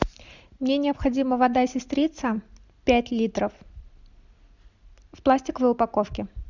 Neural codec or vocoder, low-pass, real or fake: none; 7.2 kHz; real